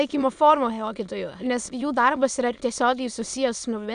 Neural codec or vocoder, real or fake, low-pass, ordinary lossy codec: autoencoder, 22.05 kHz, a latent of 192 numbers a frame, VITS, trained on many speakers; fake; 9.9 kHz; AAC, 96 kbps